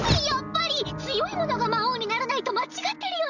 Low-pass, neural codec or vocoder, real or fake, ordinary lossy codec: 7.2 kHz; none; real; none